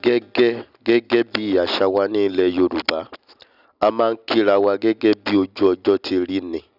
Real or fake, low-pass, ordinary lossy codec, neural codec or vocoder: real; 5.4 kHz; none; none